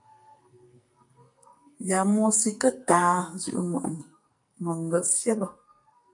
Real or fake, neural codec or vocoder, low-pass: fake; codec, 44.1 kHz, 2.6 kbps, SNAC; 10.8 kHz